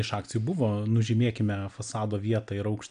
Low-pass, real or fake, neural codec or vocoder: 9.9 kHz; real; none